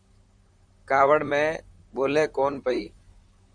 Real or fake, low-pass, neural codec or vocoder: fake; 9.9 kHz; vocoder, 44.1 kHz, 128 mel bands, Pupu-Vocoder